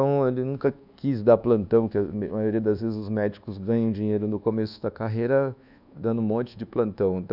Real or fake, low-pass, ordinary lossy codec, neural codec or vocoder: fake; 5.4 kHz; none; codec, 24 kHz, 1.2 kbps, DualCodec